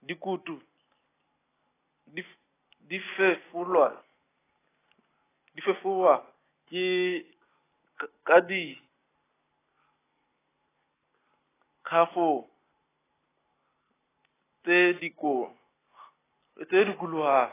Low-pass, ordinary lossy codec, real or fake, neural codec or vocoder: 3.6 kHz; AAC, 16 kbps; real; none